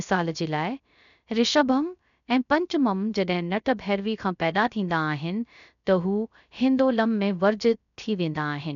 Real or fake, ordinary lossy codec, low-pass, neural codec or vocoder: fake; none; 7.2 kHz; codec, 16 kHz, 0.3 kbps, FocalCodec